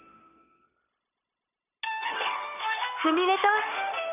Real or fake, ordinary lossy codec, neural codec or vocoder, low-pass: fake; none; codec, 16 kHz, 0.9 kbps, LongCat-Audio-Codec; 3.6 kHz